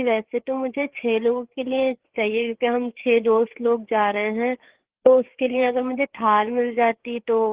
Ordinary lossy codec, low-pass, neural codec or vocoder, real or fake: Opus, 16 kbps; 3.6 kHz; codec, 16 kHz, 4 kbps, FreqCodec, larger model; fake